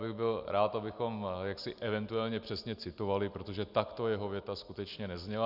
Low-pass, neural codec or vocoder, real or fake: 5.4 kHz; none; real